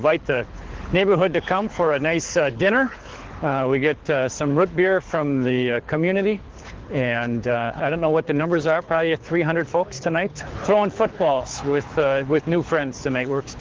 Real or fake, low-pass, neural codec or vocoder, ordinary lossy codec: fake; 7.2 kHz; codec, 24 kHz, 6 kbps, HILCodec; Opus, 16 kbps